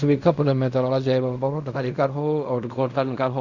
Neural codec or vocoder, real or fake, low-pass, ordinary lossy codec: codec, 16 kHz in and 24 kHz out, 0.4 kbps, LongCat-Audio-Codec, fine tuned four codebook decoder; fake; 7.2 kHz; none